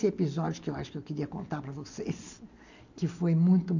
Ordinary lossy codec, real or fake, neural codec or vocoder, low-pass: none; real; none; 7.2 kHz